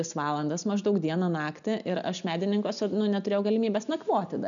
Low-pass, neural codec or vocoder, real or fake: 7.2 kHz; none; real